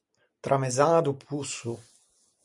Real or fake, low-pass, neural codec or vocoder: real; 10.8 kHz; none